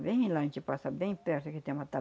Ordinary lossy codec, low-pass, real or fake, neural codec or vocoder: none; none; real; none